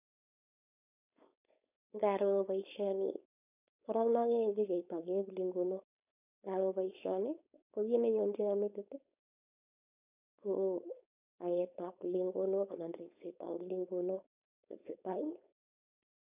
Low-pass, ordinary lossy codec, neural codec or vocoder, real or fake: 3.6 kHz; none; codec, 16 kHz, 4.8 kbps, FACodec; fake